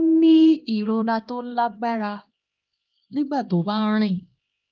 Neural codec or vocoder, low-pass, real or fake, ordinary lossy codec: codec, 16 kHz, 1 kbps, X-Codec, HuBERT features, trained on LibriSpeech; 7.2 kHz; fake; Opus, 24 kbps